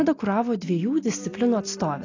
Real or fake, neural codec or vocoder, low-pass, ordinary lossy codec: real; none; 7.2 kHz; AAC, 32 kbps